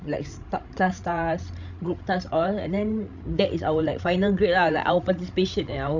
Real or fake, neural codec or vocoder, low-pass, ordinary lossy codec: fake; codec, 16 kHz, 16 kbps, FreqCodec, larger model; 7.2 kHz; none